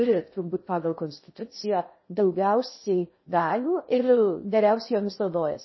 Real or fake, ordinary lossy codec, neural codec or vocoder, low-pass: fake; MP3, 24 kbps; codec, 16 kHz in and 24 kHz out, 0.6 kbps, FocalCodec, streaming, 2048 codes; 7.2 kHz